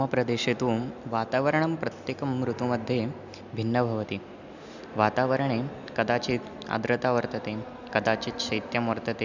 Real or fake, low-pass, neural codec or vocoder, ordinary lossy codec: real; 7.2 kHz; none; none